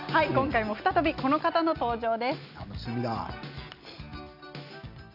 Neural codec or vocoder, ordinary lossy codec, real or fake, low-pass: none; none; real; 5.4 kHz